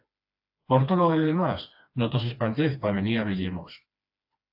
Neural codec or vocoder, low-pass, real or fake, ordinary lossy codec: codec, 16 kHz, 2 kbps, FreqCodec, smaller model; 5.4 kHz; fake; AAC, 48 kbps